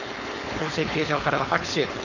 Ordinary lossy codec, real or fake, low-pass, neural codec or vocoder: none; fake; 7.2 kHz; codec, 16 kHz, 4.8 kbps, FACodec